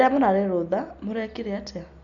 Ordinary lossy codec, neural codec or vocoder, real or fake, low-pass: none; none; real; 7.2 kHz